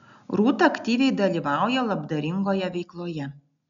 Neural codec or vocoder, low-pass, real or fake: none; 7.2 kHz; real